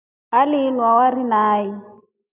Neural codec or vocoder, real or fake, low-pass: none; real; 3.6 kHz